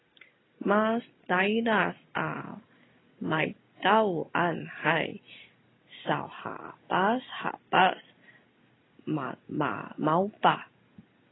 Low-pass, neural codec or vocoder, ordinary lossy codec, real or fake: 7.2 kHz; none; AAC, 16 kbps; real